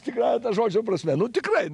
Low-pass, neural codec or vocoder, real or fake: 10.8 kHz; none; real